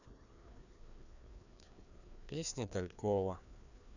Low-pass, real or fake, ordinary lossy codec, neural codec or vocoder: 7.2 kHz; fake; none; codec, 16 kHz, 2 kbps, FreqCodec, larger model